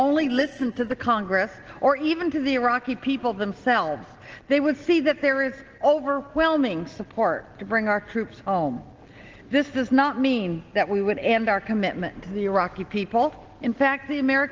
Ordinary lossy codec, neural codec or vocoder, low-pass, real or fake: Opus, 16 kbps; none; 7.2 kHz; real